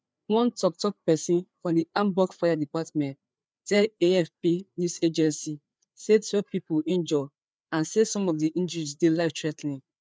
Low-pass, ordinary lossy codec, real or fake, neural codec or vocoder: none; none; fake; codec, 16 kHz, 4 kbps, FreqCodec, larger model